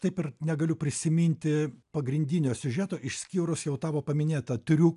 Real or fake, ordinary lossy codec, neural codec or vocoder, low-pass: real; AAC, 96 kbps; none; 10.8 kHz